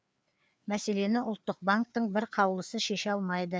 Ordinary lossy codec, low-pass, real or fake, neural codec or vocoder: none; none; fake; codec, 16 kHz, 4 kbps, FreqCodec, larger model